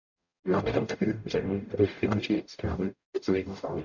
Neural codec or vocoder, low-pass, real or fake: codec, 44.1 kHz, 0.9 kbps, DAC; 7.2 kHz; fake